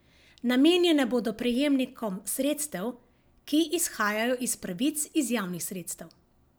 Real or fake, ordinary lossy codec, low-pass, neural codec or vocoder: real; none; none; none